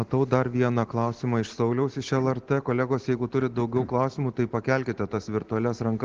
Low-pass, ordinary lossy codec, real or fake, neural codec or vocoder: 7.2 kHz; Opus, 16 kbps; real; none